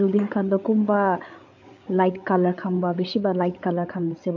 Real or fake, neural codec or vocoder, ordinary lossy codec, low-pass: fake; codec, 16 kHz, 8 kbps, FreqCodec, larger model; AAC, 48 kbps; 7.2 kHz